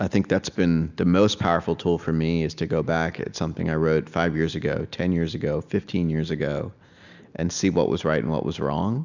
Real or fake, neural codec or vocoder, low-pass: real; none; 7.2 kHz